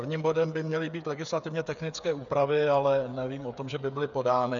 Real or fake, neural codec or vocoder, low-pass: fake; codec, 16 kHz, 8 kbps, FreqCodec, smaller model; 7.2 kHz